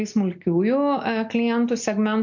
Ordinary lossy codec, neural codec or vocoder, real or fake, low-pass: MP3, 48 kbps; none; real; 7.2 kHz